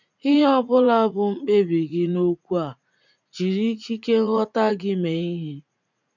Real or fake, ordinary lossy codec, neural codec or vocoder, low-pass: fake; none; vocoder, 44.1 kHz, 80 mel bands, Vocos; 7.2 kHz